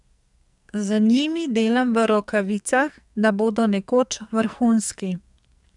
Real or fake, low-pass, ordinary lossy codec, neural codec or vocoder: fake; 10.8 kHz; none; codec, 44.1 kHz, 2.6 kbps, SNAC